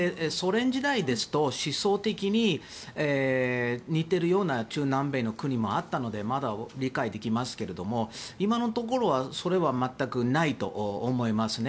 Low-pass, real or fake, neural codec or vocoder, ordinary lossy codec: none; real; none; none